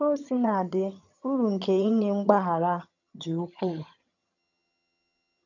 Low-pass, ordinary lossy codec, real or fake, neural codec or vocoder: 7.2 kHz; none; fake; vocoder, 22.05 kHz, 80 mel bands, HiFi-GAN